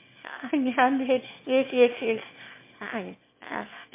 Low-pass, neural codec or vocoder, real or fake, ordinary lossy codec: 3.6 kHz; autoencoder, 22.05 kHz, a latent of 192 numbers a frame, VITS, trained on one speaker; fake; MP3, 24 kbps